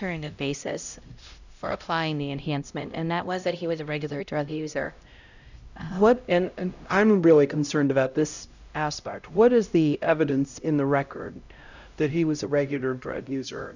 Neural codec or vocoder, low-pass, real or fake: codec, 16 kHz, 0.5 kbps, X-Codec, HuBERT features, trained on LibriSpeech; 7.2 kHz; fake